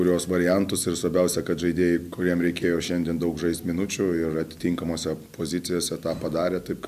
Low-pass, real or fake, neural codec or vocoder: 14.4 kHz; real; none